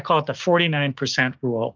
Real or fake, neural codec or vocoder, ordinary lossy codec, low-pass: fake; codec, 16 kHz, 6 kbps, DAC; Opus, 32 kbps; 7.2 kHz